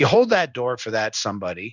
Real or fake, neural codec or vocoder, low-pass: fake; codec, 16 kHz in and 24 kHz out, 1 kbps, XY-Tokenizer; 7.2 kHz